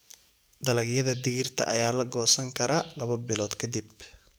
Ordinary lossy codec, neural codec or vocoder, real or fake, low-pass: none; codec, 44.1 kHz, 7.8 kbps, DAC; fake; none